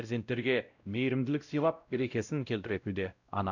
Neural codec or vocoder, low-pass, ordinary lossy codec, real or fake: codec, 16 kHz, 0.5 kbps, X-Codec, WavLM features, trained on Multilingual LibriSpeech; 7.2 kHz; none; fake